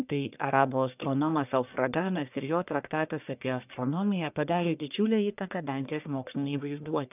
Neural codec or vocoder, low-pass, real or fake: codec, 44.1 kHz, 1.7 kbps, Pupu-Codec; 3.6 kHz; fake